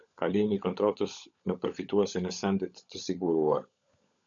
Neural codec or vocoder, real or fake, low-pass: codec, 16 kHz, 16 kbps, FunCodec, trained on LibriTTS, 50 frames a second; fake; 7.2 kHz